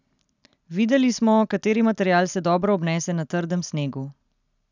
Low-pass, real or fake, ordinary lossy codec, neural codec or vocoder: 7.2 kHz; real; none; none